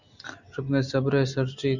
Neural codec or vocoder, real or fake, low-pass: none; real; 7.2 kHz